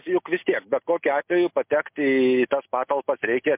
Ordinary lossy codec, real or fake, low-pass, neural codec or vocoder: MP3, 32 kbps; real; 3.6 kHz; none